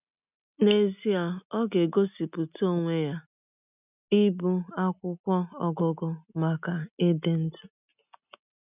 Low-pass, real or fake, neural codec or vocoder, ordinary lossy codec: 3.6 kHz; real; none; none